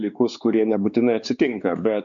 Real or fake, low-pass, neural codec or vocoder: fake; 7.2 kHz; codec, 16 kHz, 4 kbps, X-Codec, WavLM features, trained on Multilingual LibriSpeech